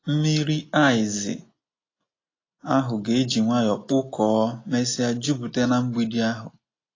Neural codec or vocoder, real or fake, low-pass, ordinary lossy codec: none; real; 7.2 kHz; AAC, 32 kbps